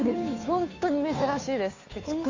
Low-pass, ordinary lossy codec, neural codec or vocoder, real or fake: 7.2 kHz; AAC, 48 kbps; codec, 16 kHz, 2 kbps, FunCodec, trained on Chinese and English, 25 frames a second; fake